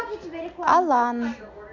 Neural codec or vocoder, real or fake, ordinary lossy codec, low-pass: none; real; MP3, 48 kbps; 7.2 kHz